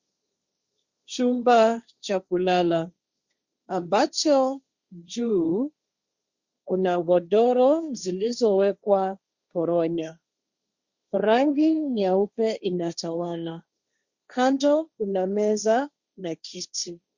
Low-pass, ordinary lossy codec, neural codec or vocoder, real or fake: 7.2 kHz; Opus, 64 kbps; codec, 16 kHz, 1.1 kbps, Voila-Tokenizer; fake